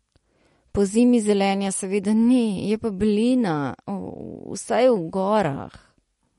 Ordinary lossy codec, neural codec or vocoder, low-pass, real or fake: MP3, 48 kbps; none; 19.8 kHz; real